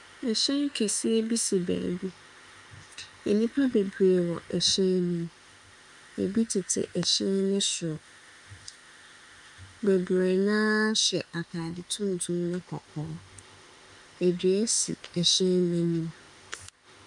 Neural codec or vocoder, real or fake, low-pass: autoencoder, 48 kHz, 32 numbers a frame, DAC-VAE, trained on Japanese speech; fake; 10.8 kHz